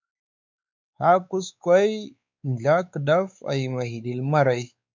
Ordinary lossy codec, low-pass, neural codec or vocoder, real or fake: MP3, 64 kbps; 7.2 kHz; codec, 16 kHz, 4 kbps, X-Codec, WavLM features, trained on Multilingual LibriSpeech; fake